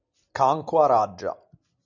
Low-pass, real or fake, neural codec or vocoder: 7.2 kHz; real; none